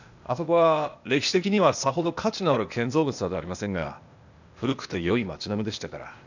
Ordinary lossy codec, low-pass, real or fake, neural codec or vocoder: none; 7.2 kHz; fake; codec, 16 kHz, 0.8 kbps, ZipCodec